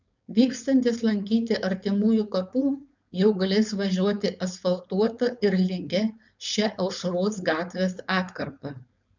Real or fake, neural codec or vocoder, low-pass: fake; codec, 16 kHz, 4.8 kbps, FACodec; 7.2 kHz